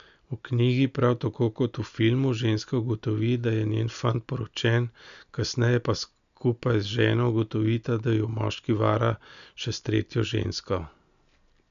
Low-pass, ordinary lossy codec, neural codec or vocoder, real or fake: 7.2 kHz; none; none; real